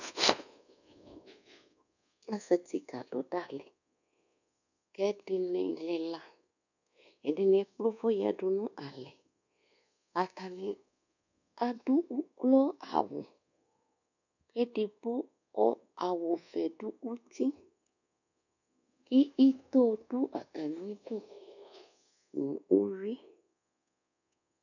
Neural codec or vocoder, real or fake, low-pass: codec, 24 kHz, 1.2 kbps, DualCodec; fake; 7.2 kHz